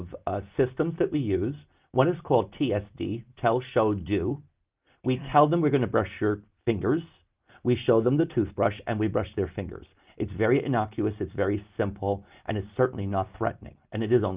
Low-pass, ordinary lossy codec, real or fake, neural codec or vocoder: 3.6 kHz; Opus, 32 kbps; real; none